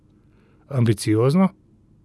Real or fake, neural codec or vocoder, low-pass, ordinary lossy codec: real; none; none; none